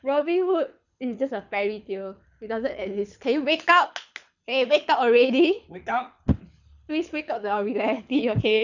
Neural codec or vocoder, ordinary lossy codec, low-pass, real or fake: codec, 24 kHz, 6 kbps, HILCodec; none; 7.2 kHz; fake